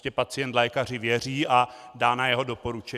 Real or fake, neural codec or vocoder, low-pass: real; none; 14.4 kHz